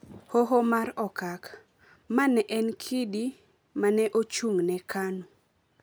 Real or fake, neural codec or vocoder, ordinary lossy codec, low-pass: real; none; none; none